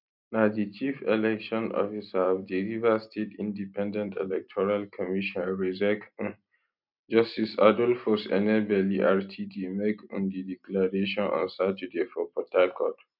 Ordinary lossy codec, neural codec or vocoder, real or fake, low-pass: none; none; real; 5.4 kHz